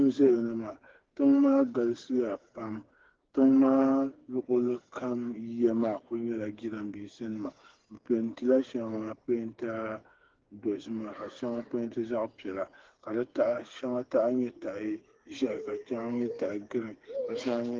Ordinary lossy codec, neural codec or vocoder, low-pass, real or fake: Opus, 16 kbps; codec, 16 kHz, 4 kbps, FreqCodec, smaller model; 7.2 kHz; fake